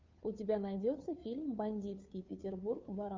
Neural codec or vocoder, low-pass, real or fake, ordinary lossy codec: codec, 16 kHz, 2 kbps, FunCodec, trained on Chinese and English, 25 frames a second; 7.2 kHz; fake; MP3, 64 kbps